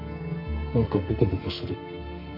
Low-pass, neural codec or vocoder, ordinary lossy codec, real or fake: 5.4 kHz; codec, 44.1 kHz, 2.6 kbps, SNAC; none; fake